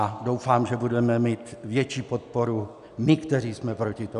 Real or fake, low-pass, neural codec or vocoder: real; 10.8 kHz; none